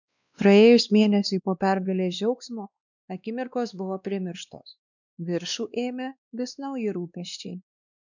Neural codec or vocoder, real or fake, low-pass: codec, 16 kHz, 2 kbps, X-Codec, WavLM features, trained on Multilingual LibriSpeech; fake; 7.2 kHz